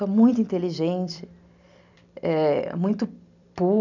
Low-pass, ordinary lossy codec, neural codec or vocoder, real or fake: 7.2 kHz; none; vocoder, 44.1 kHz, 128 mel bands every 256 samples, BigVGAN v2; fake